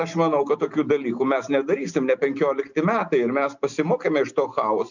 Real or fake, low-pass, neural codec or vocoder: real; 7.2 kHz; none